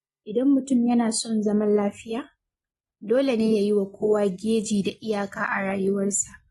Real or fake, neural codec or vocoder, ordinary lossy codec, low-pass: real; none; AAC, 32 kbps; 19.8 kHz